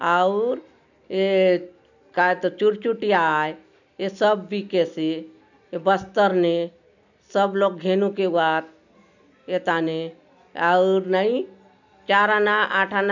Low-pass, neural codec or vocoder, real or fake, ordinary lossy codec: 7.2 kHz; none; real; none